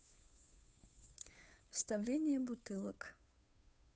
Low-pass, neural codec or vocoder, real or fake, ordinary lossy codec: none; codec, 16 kHz, 2 kbps, FunCodec, trained on Chinese and English, 25 frames a second; fake; none